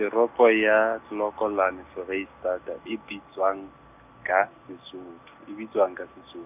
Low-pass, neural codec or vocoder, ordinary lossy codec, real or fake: 3.6 kHz; none; none; real